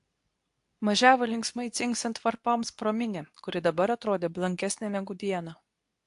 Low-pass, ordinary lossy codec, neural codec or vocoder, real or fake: 10.8 kHz; AAC, 64 kbps; codec, 24 kHz, 0.9 kbps, WavTokenizer, medium speech release version 2; fake